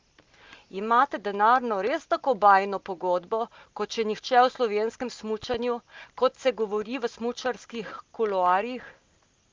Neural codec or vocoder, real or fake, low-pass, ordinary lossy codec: none; real; 7.2 kHz; Opus, 32 kbps